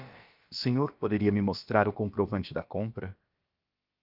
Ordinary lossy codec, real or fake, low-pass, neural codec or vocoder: Opus, 32 kbps; fake; 5.4 kHz; codec, 16 kHz, about 1 kbps, DyCAST, with the encoder's durations